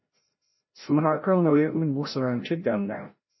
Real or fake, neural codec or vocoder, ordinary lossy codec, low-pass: fake; codec, 16 kHz, 0.5 kbps, FreqCodec, larger model; MP3, 24 kbps; 7.2 kHz